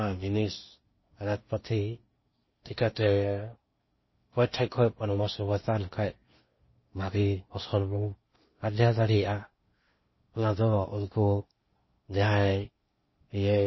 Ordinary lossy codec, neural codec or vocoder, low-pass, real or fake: MP3, 24 kbps; codec, 16 kHz in and 24 kHz out, 0.8 kbps, FocalCodec, streaming, 65536 codes; 7.2 kHz; fake